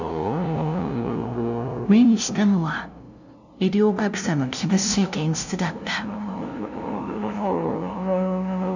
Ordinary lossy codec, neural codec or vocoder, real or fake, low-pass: none; codec, 16 kHz, 0.5 kbps, FunCodec, trained on LibriTTS, 25 frames a second; fake; 7.2 kHz